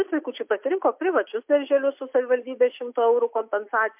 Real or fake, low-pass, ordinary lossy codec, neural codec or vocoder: real; 3.6 kHz; MP3, 32 kbps; none